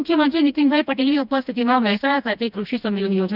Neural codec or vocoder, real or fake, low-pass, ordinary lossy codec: codec, 16 kHz, 1 kbps, FreqCodec, smaller model; fake; 5.4 kHz; none